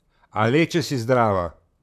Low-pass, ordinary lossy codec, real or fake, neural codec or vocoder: 14.4 kHz; none; fake; vocoder, 44.1 kHz, 128 mel bands, Pupu-Vocoder